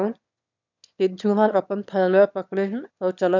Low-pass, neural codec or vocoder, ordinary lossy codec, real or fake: 7.2 kHz; autoencoder, 22.05 kHz, a latent of 192 numbers a frame, VITS, trained on one speaker; none; fake